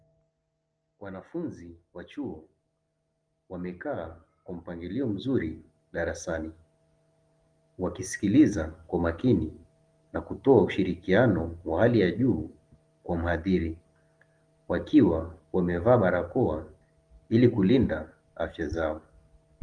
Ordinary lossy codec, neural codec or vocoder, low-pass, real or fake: Opus, 24 kbps; none; 9.9 kHz; real